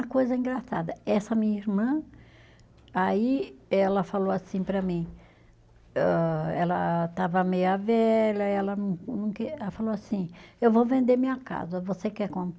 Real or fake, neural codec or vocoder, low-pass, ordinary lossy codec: real; none; none; none